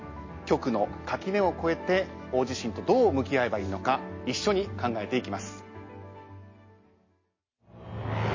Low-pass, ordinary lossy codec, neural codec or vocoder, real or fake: 7.2 kHz; MP3, 32 kbps; none; real